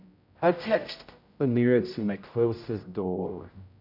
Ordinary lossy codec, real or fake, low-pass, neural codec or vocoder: none; fake; 5.4 kHz; codec, 16 kHz, 0.5 kbps, X-Codec, HuBERT features, trained on general audio